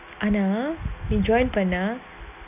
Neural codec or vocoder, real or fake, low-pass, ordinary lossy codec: none; real; 3.6 kHz; none